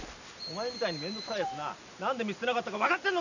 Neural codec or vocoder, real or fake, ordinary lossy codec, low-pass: none; real; none; 7.2 kHz